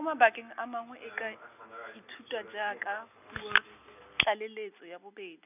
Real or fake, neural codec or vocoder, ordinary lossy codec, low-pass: real; none; none; 3.6 kHz